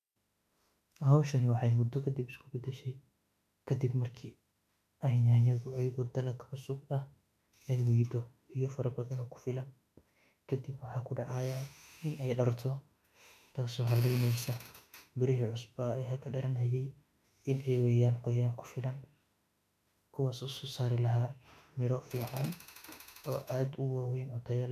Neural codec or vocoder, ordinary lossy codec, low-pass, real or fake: autoencoder, 48 kHz, 32 numbers a frame, DAC-VAE, trained on Japanese speech; none; 14.4 kHz; fake